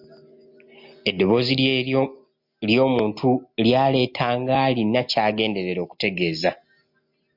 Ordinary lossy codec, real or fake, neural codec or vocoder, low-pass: MP3, 48 kbps; real; none; 5.4 kHz